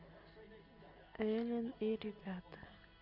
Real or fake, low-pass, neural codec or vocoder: real; 5.4 kHz; none